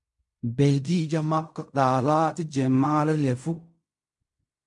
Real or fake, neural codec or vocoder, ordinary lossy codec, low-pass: fake; codec, 16 kHz in and 24 kHz out, 0.4 kbps, LongCat-Audio-Codec, fine tuned four codebook decoder; MP3, 64 kbps; 10.8 kHz